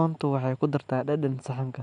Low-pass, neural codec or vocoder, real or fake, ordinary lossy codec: 9.9 kHz; none; real; none